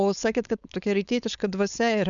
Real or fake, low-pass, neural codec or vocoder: fake; 7.2 kHz; codec, 16 kHz, 4.8 kbps, FACodec